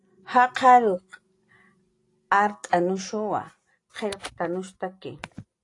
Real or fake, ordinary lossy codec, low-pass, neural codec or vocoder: real; AAC, 48 kbps; 10.8 kHz; none